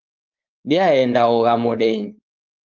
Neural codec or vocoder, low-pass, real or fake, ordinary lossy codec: codec, 16 kHz, 4.8 kbps, FACodec; 7.2 kHz; fake; Opus, 24 kbps